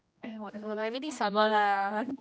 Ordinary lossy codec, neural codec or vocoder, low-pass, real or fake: none; codec, 16 kHz, 1 kbps, X-Codec, HuBERT features, trained on general audio; none; fake